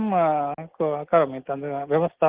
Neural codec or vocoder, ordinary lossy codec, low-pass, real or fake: none; Opus, 16 kbps; 3.6 kHz; real